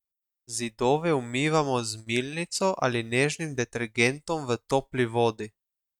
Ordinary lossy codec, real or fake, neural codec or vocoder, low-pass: none; real; none; 19.8 kHz